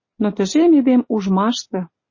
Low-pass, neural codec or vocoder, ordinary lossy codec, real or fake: 7.2 kHz; none; MP3, 32 kbps; real